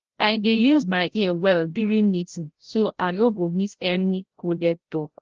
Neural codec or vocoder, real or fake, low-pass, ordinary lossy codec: codec, 16 kHz, 0.5 kbps, FreqCodec, larger model; fake; 7.2 kHz; Opus, 16 kbps